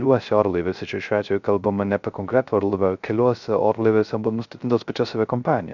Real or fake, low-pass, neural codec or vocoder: fake; 7.2 kHz; codec, 16 kHz, 0.3 kbps, FocalCodec